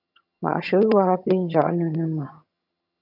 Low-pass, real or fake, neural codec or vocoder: 5.4 kHz; fake; vocoder, 22.05 kHz, 80 mel bands, HiFi-GAN